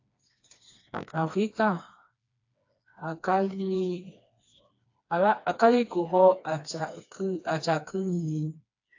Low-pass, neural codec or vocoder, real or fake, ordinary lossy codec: 7.2 kHz; codec, 16 kHz, 2 kbps, FreqCodec, smaller model; fake; AAC, 48 kbps